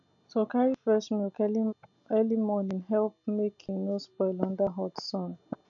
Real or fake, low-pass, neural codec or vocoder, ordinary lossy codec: real; 7.2 kHz; none; none